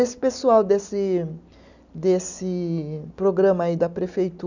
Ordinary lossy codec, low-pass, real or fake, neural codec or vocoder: none; 7.2 kHz; real; none